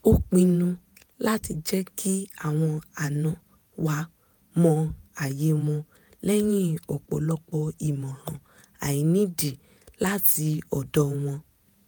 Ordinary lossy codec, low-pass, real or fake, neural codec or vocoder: none; none; fake; vocoder, 48 kHz, 128 mel bands, Vocos